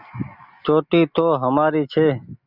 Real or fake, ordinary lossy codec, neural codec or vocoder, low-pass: real; Opus, 64 kbps; none; 5.4 kHz